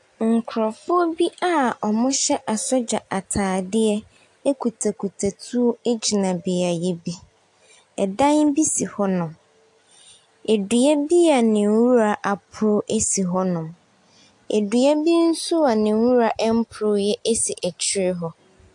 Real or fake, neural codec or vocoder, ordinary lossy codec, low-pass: real; none; AAC, 64 kbps; 10.8 kHz